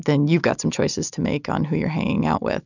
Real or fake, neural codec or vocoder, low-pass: real; none; 7.2 kHz